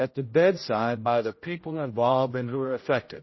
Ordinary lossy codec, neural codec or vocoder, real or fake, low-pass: MP3, 24 kbps; codec, 16 kHz, 0.5 kbps, X-Codec, HuBERT features, trained on general audio; fake; 7.2 kHz